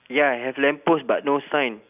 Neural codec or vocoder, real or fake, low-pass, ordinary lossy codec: none; real; 3.6 kHz; none